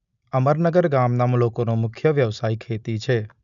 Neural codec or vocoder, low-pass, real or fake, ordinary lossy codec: none; 7.2 kHz; real; none